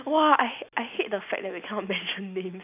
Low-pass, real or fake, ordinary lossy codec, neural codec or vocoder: 3.6 kHz; real; none; none